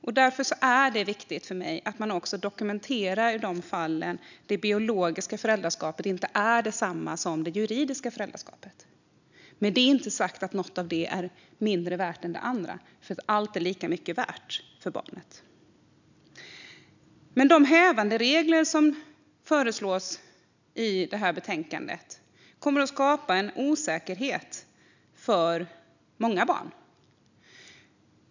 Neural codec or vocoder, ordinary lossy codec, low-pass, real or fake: none; none; 7.2 kHz; real